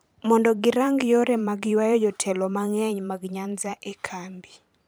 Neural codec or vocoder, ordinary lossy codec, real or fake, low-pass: vocoder, 44.1 kHz, 128 mel bands every 512 samples, BigVGAN v2; none; fake; none